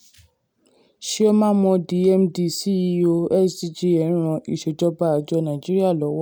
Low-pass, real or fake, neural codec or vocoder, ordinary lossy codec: none; real; none; none